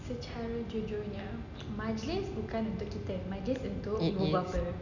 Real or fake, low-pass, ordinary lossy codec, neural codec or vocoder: real; 7.2 kHz; none; none